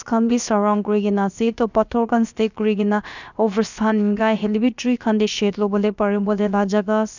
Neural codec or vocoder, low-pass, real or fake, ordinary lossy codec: codec, 16 kHz, about 1 kbps, DyCAST, with the encoder's durations; 7.2 kHz; fake; none